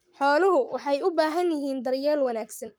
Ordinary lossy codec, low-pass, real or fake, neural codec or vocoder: none; none; fake; codec, 44.1 kHz, 7.8 kbps, Pupu-Codec